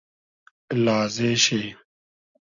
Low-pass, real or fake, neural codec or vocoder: 7.2 kHz; real; none